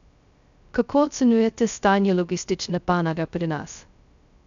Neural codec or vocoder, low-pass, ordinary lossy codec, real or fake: codec, 16 kHz, 0.2 kbps, FocalCodec; 7.2 kHz; none; fake